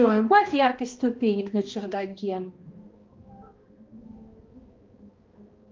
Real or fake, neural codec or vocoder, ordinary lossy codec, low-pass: fake; codec, 16 kHz, 1 kbps, X-Codec, HuBERT features, trained on balanced general audio; Opus, 32 kbps; 7.2 kHz